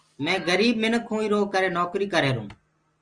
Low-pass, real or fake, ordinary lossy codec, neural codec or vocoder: 9.9 kHz; real; Opus, 32 kbps; none